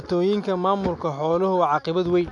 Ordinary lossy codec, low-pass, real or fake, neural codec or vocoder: AAC, 64 kbps; 10.8 kHz; real; none